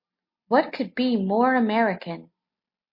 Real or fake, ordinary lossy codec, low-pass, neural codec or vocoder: real; MP3, 32 kbps; 5.4 kHz; none